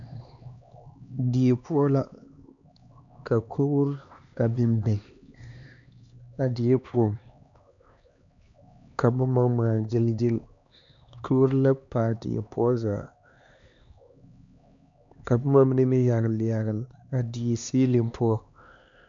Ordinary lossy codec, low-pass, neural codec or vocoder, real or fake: MP3, 64 kbps; 7.2 kHz; codec, 16 kHz, 2 kbps, X-Codec, HuBERT features, trained on LibriSpeech; fake